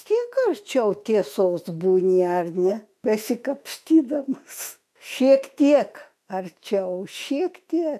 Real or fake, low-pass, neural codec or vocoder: fake; 14.4 kHz; autoencoder, 48 kHz, 32 numbers a frame, DAC-VAE, trained on Japanese speech